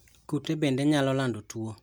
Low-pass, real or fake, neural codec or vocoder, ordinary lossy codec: none; real; none; none